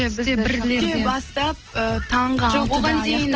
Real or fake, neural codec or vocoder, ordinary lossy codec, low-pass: real; none; Opus, 16 kbps; 7.2 kHz